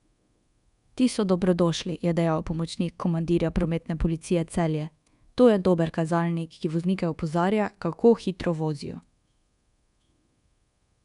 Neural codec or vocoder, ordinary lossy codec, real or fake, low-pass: codec, 24 kHz, 1.2 kbps, DualCodec; none; fake; 10.8 kHz